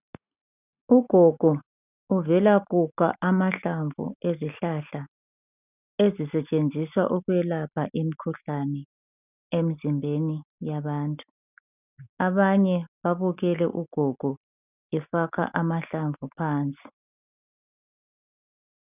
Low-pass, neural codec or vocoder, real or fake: 3.6 kHz; none; real